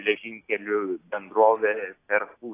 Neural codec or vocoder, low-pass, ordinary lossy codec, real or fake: none; 3.6 kHz; AAC, 24 kbps; real